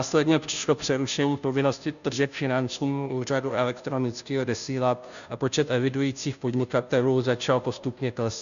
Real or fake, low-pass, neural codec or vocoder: fake; 7.2 kHz; codec, 16 kHz, 0.5 kbps, FunCodec, trained on Chinese and English, 25 frames a second